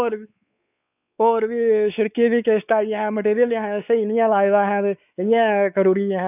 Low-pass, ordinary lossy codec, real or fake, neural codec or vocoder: 3.6 kHz; none; fake; codec, 16 kHz, 4 kbps, X-Codec, WavLM features, trained on Multilingual LibriSpeech